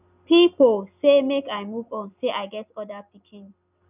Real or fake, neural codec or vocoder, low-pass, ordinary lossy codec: real; none; 3.6 kHz; none